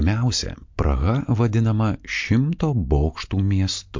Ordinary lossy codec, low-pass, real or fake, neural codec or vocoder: MP3, 48 kbps; 7.2 kHz; real; none